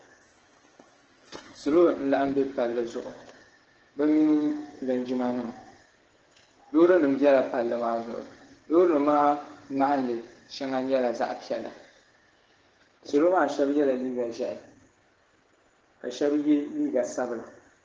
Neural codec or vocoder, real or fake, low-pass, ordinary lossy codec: codec, 16 kHz, 8 kbps, FreqCodec, smaller model; fake; 7.2 kHz; Opus, 16 kbps